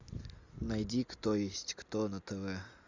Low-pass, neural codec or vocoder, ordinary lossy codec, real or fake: 7.2 kHz; none; Opus, 64 kbps; real